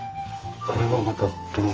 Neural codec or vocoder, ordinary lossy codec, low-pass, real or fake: codec, 44.1 kHz, 2.6 kbps, SNAC; Opus, 24 kbps; 7.2 kHz; fake